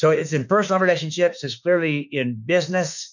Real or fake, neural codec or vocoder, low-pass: fake; autoencoder, 48 kHz, 32 numbers a frame, DAC-VAE, trained on Japanese speech; 7.2 kHz